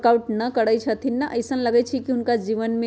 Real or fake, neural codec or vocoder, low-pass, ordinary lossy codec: real; none; none; none